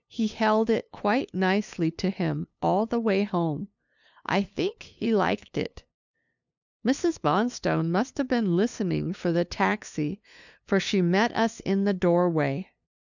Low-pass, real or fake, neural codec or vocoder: 7.2 kHz; fake; codec, 16 kHz, 2 kbps, FunCodec, trained on LibriTTS, 25 frames a second